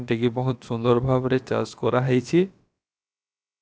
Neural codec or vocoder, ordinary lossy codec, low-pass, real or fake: codec, 16 kHz, about 1 kbps, DyCAST, with the encoder's durations; none; none; fake